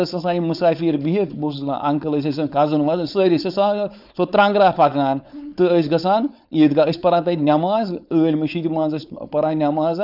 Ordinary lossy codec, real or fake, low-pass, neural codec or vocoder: none; fake; 5.4 kHz; codec, 16 kHz, 4.8 kbps, FACodec